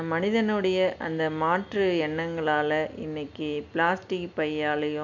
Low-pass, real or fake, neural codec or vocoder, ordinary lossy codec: 7.2 kHz; real; none; none